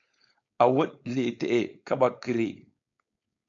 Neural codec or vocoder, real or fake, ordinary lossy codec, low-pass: codec, 16 kHz, 4.8 kbps, FACodec; fake; MP3, 64 kbps; 7.2 kHz